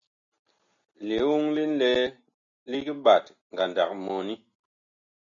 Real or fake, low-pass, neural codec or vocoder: real; 7.2 kHz; none